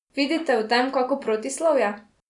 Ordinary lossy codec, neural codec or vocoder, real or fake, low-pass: none; none; real; 10.8 kHz